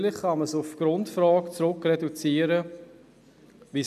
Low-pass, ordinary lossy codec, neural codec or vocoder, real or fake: 14.4 kHz; none; none; real